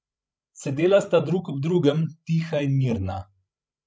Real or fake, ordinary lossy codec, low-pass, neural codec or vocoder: fake; none; none; codec, 16 kHz, 16 kbps, FreqCodec, larger model